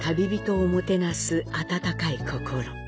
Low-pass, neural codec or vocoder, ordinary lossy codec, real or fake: none; none; none; real